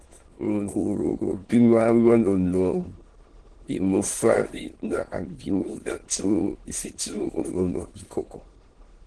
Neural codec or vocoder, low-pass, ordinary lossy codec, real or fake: autoencoder, 22.05 kHz, a latent of 192 numbers a frame, VITS, trained on many speakers; 9.9 kHz; Opus, 16 kbps; fake